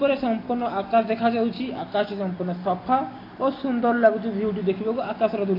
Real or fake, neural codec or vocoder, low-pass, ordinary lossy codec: real; none; 5.4 kHz; AAC, 24 kbps